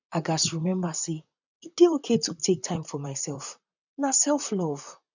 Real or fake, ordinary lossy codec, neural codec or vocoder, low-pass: fake; none; vocoder, 44.1 kHz, 128 mel bands, Pupu-Vocoder; 7.2 kHz